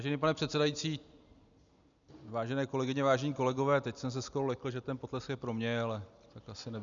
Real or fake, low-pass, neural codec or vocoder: real; 7.2 kHz; none